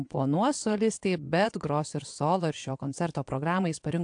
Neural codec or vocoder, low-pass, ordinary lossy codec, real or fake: vocoder, 22.05 kHz, 80 mel bands, WaveNeXt; 9.9 kHz; AAC, 64 kbps; fake